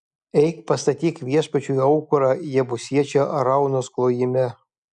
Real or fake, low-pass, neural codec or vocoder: real; 10.8 kHz; none